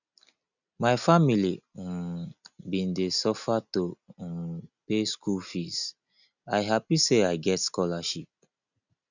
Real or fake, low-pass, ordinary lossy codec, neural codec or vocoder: real; 7.2 kHz; none; none